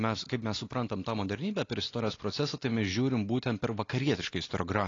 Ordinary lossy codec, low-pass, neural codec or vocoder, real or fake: AAC, 32 kbps; 7.2 kHz; none; real